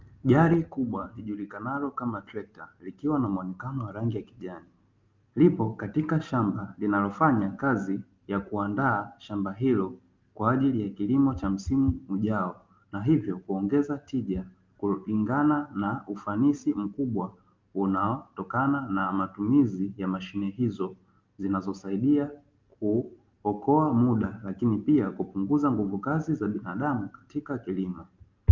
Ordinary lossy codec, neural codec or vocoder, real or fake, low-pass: Opus, 24 kbps; none; real; 7.2 kHz